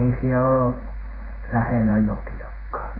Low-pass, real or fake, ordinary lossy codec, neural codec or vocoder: 5.4 kHz; fake; AAC, 32 kbps; codec, 16 kHz in and 24 kHz out, 1 kbps, XY-Tokenizer